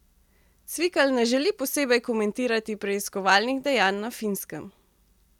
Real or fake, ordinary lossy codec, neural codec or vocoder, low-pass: real; none; none; 19.8 kHz